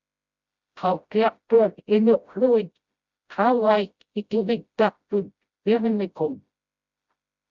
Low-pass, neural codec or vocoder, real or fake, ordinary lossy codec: 7.2 kHz; codec, 16 kHz, 0.5 kbps, FreqCodec, smaller model; fake; Opus, 64 kbps